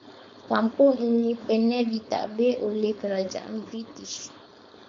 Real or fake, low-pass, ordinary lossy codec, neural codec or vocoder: fake; 7.2 kHz; AAC, 48 kbps; codec, 16 kHz, 4.8 kbps, FACodec